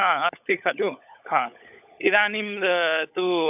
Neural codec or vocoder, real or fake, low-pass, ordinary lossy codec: codec, 16 kHz, 16 kbps, FunCodec, trained on LibriTTS, 50 frames a second; fake; 3.6 kHz; AAC, 32 kbps